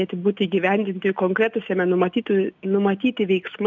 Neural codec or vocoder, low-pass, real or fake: none; 7.2 kHz; real